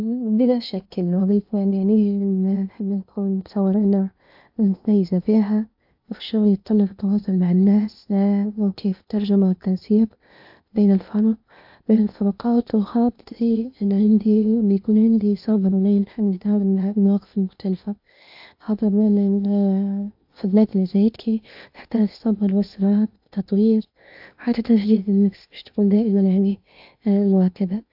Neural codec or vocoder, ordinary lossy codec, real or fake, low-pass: codec, 16 kHz in and 24 kHz out, 0.8 kbps, FocalCodec, streaming, 65536 codes; AAC, 48 kbps; fake; 5.4 kHz